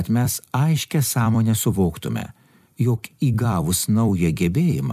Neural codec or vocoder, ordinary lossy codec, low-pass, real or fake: vocoder, 44.1 kHz, 128 mel bands every 256 samples, BigVGAN v2; MP3, 96 kbps; 14.4 kHz; fake